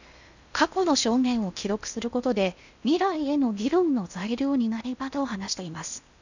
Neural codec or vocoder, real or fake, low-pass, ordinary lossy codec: codec, 16 kHz in and 24 kHz out, 0.8 kbps, FocalCodec, streaming, 65536 codes; fake; 7.2 kHz; none